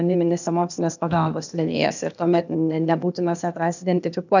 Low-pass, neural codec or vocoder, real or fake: 7.2 kHz; codec, 16 kHz, 0.8 kbps, ZipCodec; fake